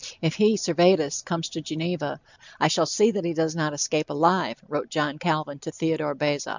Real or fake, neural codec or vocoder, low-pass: real; none; 7.2 kHz